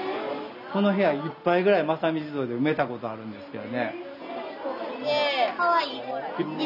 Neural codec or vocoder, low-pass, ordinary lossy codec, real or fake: none; 5.4 kHz; none; real